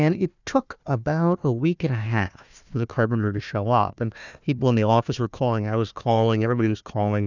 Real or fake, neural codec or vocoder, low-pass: fake; codec, 16 kHz, 1 kbps, FunCodec, trained on Chinese and English, 50 frames a second; 7.2 kHz